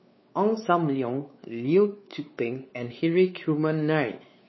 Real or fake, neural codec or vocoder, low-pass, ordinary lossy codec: fake; codec, 16 kHz, 4 kbps, X-Codec, WavLM features, trained on Multilingual LibriSpeech; 7.2 kHz; MP3, 24 kbps